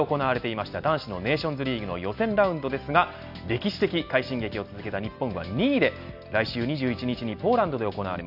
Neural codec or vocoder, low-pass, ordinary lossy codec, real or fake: none; 5.4 kHz; none; real